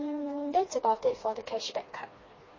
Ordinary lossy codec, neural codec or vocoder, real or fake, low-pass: MP3, 32 kbps; codec, 16 kHz, 2 kbps, FreqCodec, smaller model; fake; 7.2 kHz